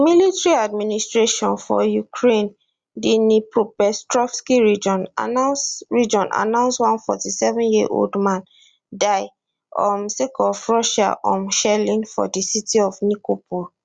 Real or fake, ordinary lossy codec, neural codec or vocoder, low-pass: real; Opus, 64 kbps; none; 9.9 kHz